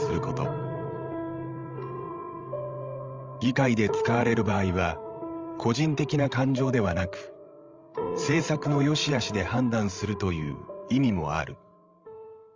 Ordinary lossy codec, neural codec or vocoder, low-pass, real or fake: Opus, 32 kbps; codec, 16 kHz, 16 kbps, FreqCodec, larger model; 7.2 kHz; fake